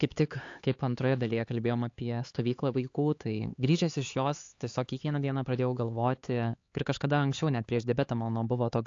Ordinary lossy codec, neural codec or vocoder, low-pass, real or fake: AAC, 48 kbps; codec, 16 kHz, 4 kbps, X-Codec, HuBERT features, trained on LibriSpeech; 7.2 kHz; fake